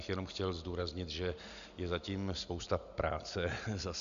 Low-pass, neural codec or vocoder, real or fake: 7.2 kHz; none; real